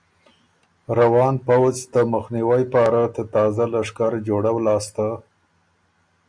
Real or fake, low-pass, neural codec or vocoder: real; 9.9 kHz; none